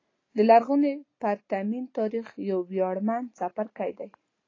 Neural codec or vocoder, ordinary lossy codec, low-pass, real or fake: none; AAC, 32 kbps; 7.2 kHz; real